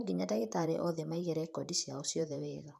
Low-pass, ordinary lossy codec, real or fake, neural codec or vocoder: 14.4 kHz; none; fake; vocoder, 44.1 kHz, 128 mel bands, Pupu-Vocoder